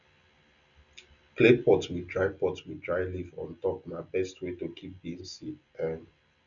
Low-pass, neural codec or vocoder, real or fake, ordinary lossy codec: 7.2 kHz; none; real; none